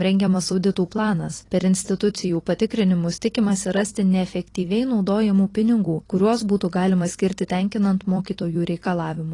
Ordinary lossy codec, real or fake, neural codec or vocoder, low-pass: AAC, 32 kbps; fake; vocoder, 44.1 kHz, 128 mel bands every 256 samples, BigVGAN v2; 10.8 kHz